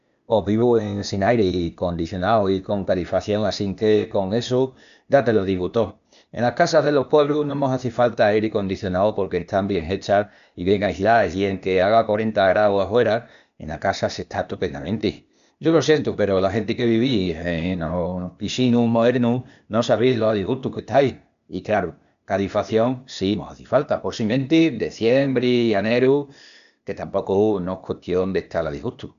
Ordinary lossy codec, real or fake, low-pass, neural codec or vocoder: none; fake; 7.2 kHz; codec, 16 kHz, 0.8 kbps, ZipCodec